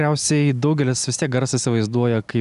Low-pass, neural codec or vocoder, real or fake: 10.8 kHz; none; real